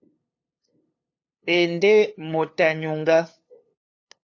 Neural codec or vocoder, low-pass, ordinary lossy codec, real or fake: codec, 16 kHz, 2 kbps, FunCodec, trained on LibriTTS, 25 frames a second; 7.2 kHz; AAC, 48 kbps; fake